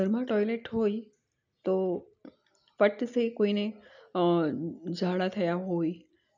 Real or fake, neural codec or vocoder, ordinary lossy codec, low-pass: real; none; none; 7.2 kHz